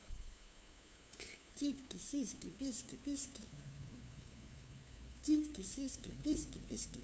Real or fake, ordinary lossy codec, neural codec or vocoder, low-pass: fake; none; codec, 16 kHz, 2 kbps, FunCodec, trained on LibriTTS, 25 frames a second; none